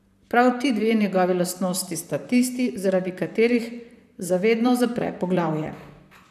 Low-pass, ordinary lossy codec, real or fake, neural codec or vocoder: 14.4 kHz; none; fake; vocoder, 44.1 kHz, 128 mel bands, Pupu-Vocoder